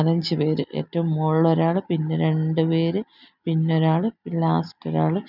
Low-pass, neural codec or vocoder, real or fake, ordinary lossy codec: 5.4 kHz; none; real; none